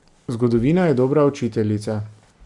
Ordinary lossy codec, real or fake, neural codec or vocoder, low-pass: none; real; none; 10.8 kHz